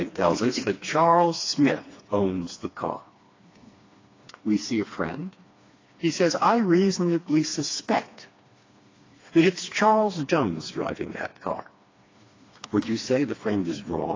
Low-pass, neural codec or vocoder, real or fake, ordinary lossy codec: 7.2 kHz; codec, 16 kHz, 2 kbps, FreqCodec, smaller model; fake; AAC, 32 kbps